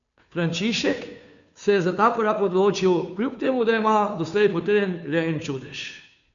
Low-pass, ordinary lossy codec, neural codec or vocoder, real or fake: 7.2 kHz; none; codec, 16 kHz, 2 kbps, FunCodec, trained on Chinese and English, 25 frames a second; fake